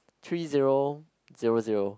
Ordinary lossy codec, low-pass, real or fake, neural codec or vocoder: none; none; real; none